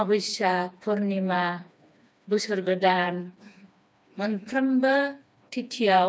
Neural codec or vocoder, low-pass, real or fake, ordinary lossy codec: codec, 16 kHz, 2 kbps, FreqCodec, smaller model; none; fake; none